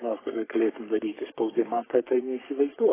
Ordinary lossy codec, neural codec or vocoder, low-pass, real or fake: AAC, 16 kbps; codec, 44.1 kHz, 3.4 kbps, Pupu-Codec; 3.6 kHz; fake